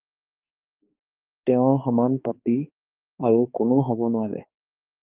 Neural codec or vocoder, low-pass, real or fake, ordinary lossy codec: codec, 16 kHz, 2 kbps, X-Codec, HuBERT features, trained on balanced general audio; 3.6 kHz; fake; Opus, 24 kbps